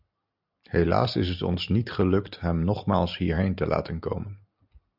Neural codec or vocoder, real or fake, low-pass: none; real; 5.4 kHz